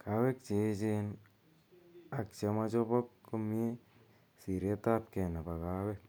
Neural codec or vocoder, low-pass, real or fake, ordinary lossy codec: none; none; real; none